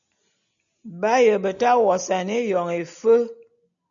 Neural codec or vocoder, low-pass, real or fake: none; 7.2 kHz; real